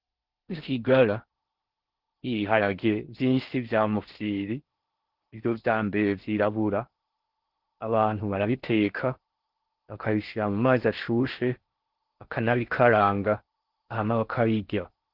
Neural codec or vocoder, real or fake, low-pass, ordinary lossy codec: codec, 16 kHz in and 24 kHz out, 0.6 kbps, FocalCodec, streaming, 4096 codes; fake; 5.4 kHz; Opus, 16 kbps